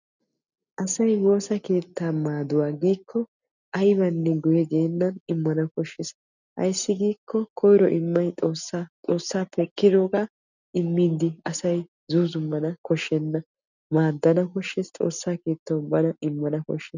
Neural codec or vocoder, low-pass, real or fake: none; 7.2 kHz; real